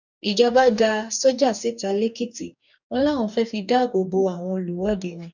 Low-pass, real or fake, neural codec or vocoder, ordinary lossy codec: 7.2 kHz; fake; codec, 44.1 kHz, 2.6 kbps, DAC; none